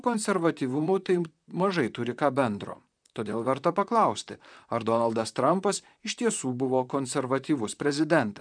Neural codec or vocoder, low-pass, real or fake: vocoder, 44.1 kHz, 128 mel bands, Pupu-Vocoder; 9.9 kHz; fake